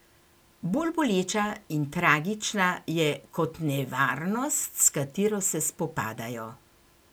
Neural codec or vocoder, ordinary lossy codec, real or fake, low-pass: none; none; real; none